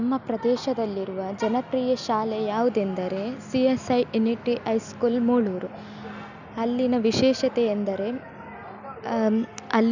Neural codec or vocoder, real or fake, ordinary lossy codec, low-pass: none; real; none; 7.2 kHz